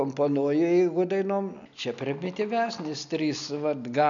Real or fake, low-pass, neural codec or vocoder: real; 7.2 kHz; none